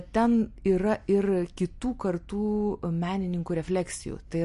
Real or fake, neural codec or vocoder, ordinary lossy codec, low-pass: real; none; MP3, 48 kbps; 14.4 kHz